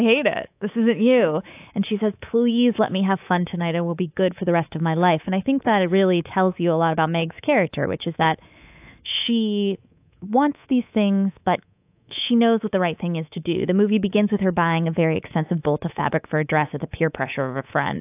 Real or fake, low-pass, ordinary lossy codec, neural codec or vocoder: fake; 3.6 kHz; AAC, 32 kbps; codec, 24 kHz, 3.1 kbps, DualCodec